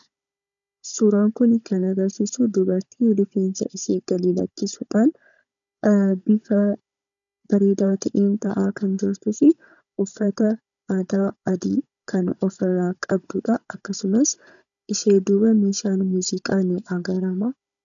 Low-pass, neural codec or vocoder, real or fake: 7.2 kHz; codec, 16 kHz, 16 kbps, FunCodec, trained on Chinese and English, 50 frames a second; fake